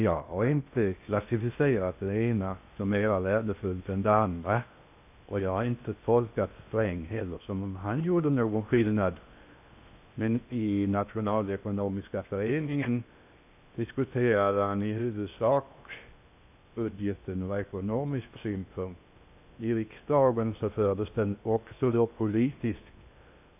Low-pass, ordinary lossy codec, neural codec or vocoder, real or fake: 3.6 kHz; AAC, 32 kbps; codec, 16 kHz in and 24 kHz out, 0.6 kbps, FocalCodec, streaming, 4096 codes; fake